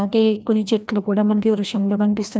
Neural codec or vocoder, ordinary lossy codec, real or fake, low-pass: codec, 16 kHz, 1 kbps, FreqCodec, larger model; none; fake; none